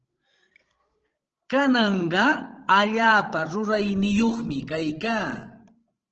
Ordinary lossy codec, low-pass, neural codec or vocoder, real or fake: Opus, 16 kbps; 7.2 kHz; codec, 16 kHz, 16 kbps, FreqCodec, larger model; fake